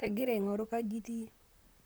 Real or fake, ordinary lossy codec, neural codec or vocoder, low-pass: fake; none; vocoder, 44.1 kHz, 128 mel bands, Pupu-Vocoder; none